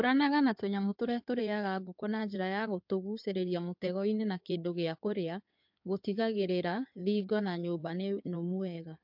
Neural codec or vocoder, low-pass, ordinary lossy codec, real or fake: codec, 16 kHz in and 24 kHz out, 2.2 kbps, FireRedTTS-2 codec; 5.4 kHz; MP3, 48 kbps; fake